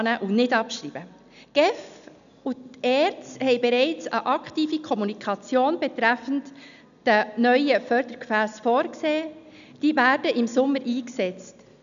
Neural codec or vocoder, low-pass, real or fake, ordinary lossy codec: none; 7.2 kHz; real; none